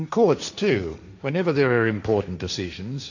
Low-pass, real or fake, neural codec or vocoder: 7.2 kHz; fake; codec, 16 kHz, 1.1 kbps, Voila-Tokenizer